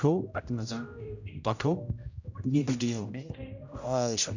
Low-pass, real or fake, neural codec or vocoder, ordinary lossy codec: 7.2 kHz; fake; codec, 16 kHz, 0.5 kbps, X-Codec, HuBERT features, trained on general audio; none